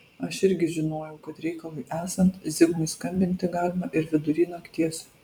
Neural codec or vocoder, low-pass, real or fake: vocoder, 44.1 kHz, 128 mel bands every 256 samples, BigVGAN v2; 19.8 kHz; fake